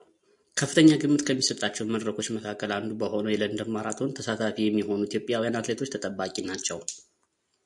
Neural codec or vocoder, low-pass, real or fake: none; 10.8 kHz; real